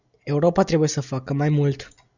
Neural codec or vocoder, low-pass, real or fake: none; 7.2 kHz; real